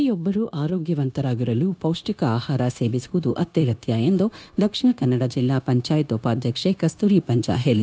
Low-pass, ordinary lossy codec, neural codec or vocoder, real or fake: none; none; codec, 16 kHz, 0.9 kbps, LongCat-Audio-Codec; fake